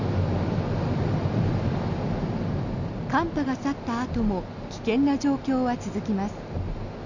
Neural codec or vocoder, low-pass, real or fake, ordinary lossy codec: none; 7.2 kHz; real; none